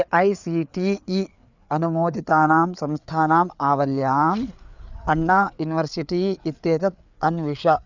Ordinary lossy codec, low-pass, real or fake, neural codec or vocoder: none; 7.2 kHz; fake; codec, 16 kHz, 4 kbps, FreqCodec, larger model